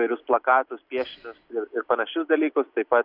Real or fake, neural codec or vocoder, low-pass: real; none; 5.4 kHz